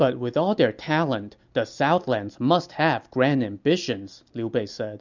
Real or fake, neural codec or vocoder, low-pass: real; none; 7.2 kHz